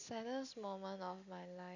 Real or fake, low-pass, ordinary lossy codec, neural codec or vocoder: real; 7.2 kHz; none; none